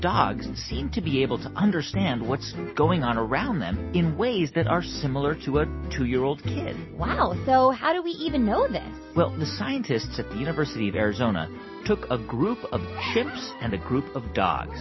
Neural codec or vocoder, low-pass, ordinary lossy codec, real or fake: none; 7.2 kHz; MP3, 24 kbps; real